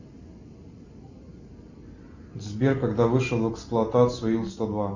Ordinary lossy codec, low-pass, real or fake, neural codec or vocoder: Opus, 64 kbps; 7.2 kHz; real; none